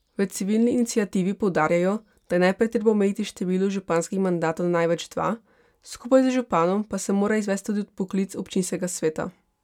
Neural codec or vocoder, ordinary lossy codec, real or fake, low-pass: none; none; real; 19.8 kHz